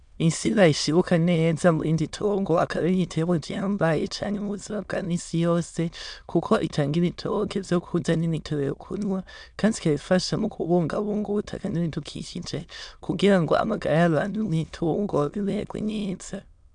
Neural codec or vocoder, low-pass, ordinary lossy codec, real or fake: autoencoder, 22.05 kHz, a latent of 192 numbers a frame, VITS, trained on many speakers; 9.9 kHz; MP3, 96 kbps; fake